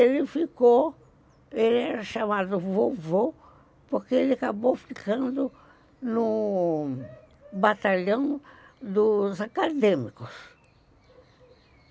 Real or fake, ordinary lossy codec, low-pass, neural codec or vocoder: real; none; none; none